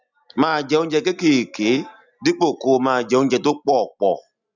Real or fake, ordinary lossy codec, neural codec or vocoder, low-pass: real; none; none; 7.2 kHz